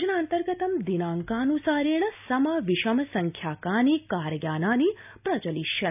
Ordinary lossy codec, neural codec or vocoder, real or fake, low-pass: none; none; real; 3.6 kHz